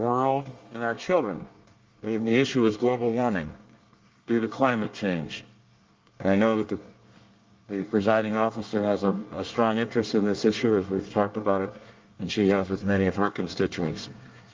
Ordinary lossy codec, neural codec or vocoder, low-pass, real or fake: Opus, 32 kbps; codec, 24 kHz, 1 kbps, SNAC; 7.2 kHz; fake